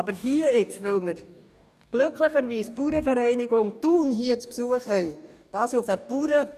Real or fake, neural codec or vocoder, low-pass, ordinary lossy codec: fake; codec, 44.1 kHz, 2.6 kbps, DAC; 14.4 kHz; none